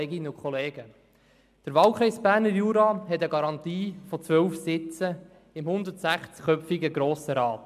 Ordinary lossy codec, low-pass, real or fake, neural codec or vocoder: MP3, 96 kbps; 14.4 kHz; real; none